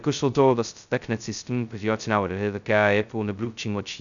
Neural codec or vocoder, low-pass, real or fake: codec, 16 kHz, 0.2 kbps, FocalCodec; 7.2 kHz; fake